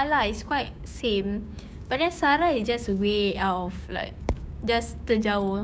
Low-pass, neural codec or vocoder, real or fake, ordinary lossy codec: none; codec, 16 kHz, 6 kbps, DAC; fake; none